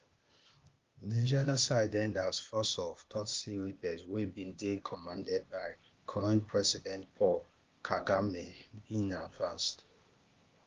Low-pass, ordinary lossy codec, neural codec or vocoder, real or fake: 7.2 kHz; Opus, 32 kbps; codec, 16 kHz, 0.8 kbps, ZipCodec; fake